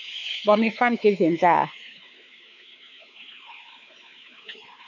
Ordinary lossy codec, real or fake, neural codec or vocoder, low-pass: none; fake; codec, 16 kHz, 4 kbps, X-Codec, WavLM features, trained on Multilingual LibriSpeech; 7.2 kHz